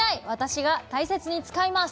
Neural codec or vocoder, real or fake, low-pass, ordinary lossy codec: none; real; none; none